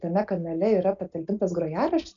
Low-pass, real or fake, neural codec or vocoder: 7.2 kHz; real; none